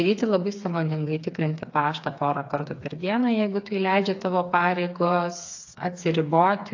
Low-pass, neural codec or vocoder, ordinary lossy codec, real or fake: 7.2 kHz; codec, 16 kHz, 4 kbps, FreqCodec, smaller model; AAC, 48 kbps; fake